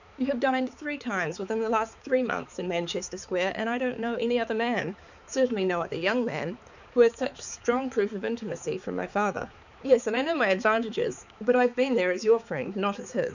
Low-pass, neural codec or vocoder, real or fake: 7.2 kHz; codec, 16 kHz, 4 kbps, X-Codec, HuBERT features, trained on balanced general audio; fake